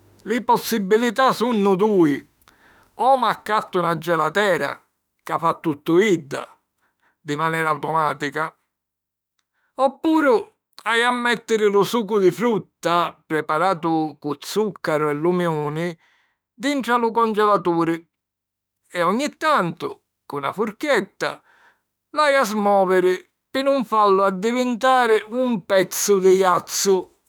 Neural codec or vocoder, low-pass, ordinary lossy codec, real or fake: autoencoder, 48 kHz, 32 numbers a frame, DAC-VAE, trained on Japanese speech; none; none; fake